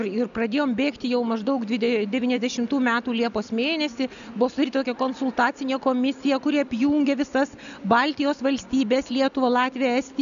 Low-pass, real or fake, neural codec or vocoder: 7.2 kHz; real; none